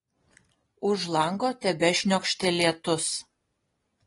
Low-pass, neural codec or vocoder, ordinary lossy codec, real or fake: 10.8 kHz; none; AAC, 32 kbps; real